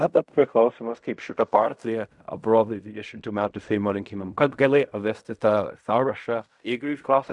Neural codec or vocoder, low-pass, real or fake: codec, 16 kHz in and 24 kHz out, 0.4 kbps, LongCat-Audio-Codec, fine tuned four codebook decoder; 10.8 kHz; fake